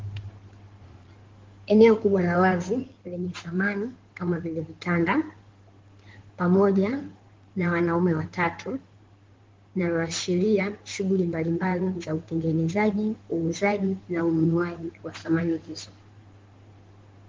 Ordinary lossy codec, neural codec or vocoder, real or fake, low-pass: Opus, 16 kbps; codec, 16 kHz in and 24 kHz out, 2.2 kbps, FireRedTTS-2 codec; fake; 7.2 kHz